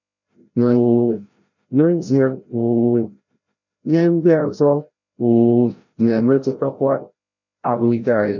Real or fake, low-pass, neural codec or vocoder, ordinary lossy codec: fake; 7.2 kHz; codec, 16 kHz, 0.5 kbps, FreqCodec, larger model; none